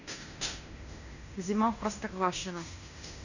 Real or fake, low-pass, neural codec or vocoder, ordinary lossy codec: fake; 7.2 kHz; codec, 16 kHz in and 24 kHz out, 0.9 kbps, LongCat-Audio-Codec, fine tuned four codebook decoder; none